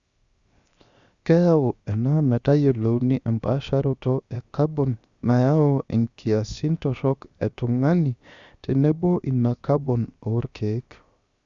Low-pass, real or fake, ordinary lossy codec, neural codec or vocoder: 7.2 kHz; fake; Opus, 64 kbps; codec, 16 kHz, 0.7 kbps, FocalCodec